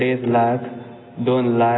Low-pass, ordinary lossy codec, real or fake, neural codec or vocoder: 7.2 kHz; AAC, 16 kbps; real; none